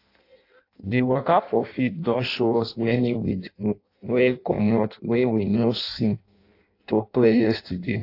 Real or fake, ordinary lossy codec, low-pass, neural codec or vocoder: fake; AAC, 32 kbps; 5.4 kHz; codec, 16 kHz in and 24 kHz out, 0.6 kbps, FireRedTTS-2 codec